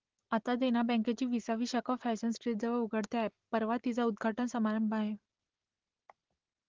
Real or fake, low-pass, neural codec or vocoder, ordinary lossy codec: real; 7.2 kHz; none; Opus, 16 kbps